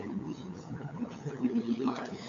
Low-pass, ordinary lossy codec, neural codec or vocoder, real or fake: 7.2 kHz; MP3, 48 kbps; codec, 16 kHz, 8 kbps, FunCodec, trained on LibriTTS, 25 frames a second; fake